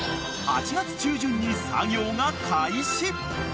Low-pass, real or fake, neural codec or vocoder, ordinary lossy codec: none; real; none; none